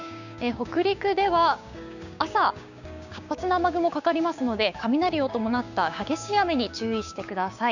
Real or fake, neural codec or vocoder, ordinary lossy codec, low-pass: fake; codec, 16 kHz, 6 kbps, DAC; none; 7.2 kHz